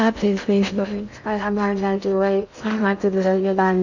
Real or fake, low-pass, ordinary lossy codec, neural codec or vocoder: fake; 7.2 kHz; none; codec, 16 kHz in and 24 kHz out, 0.6 kbps, FocalCodec, streaming, 2048 codes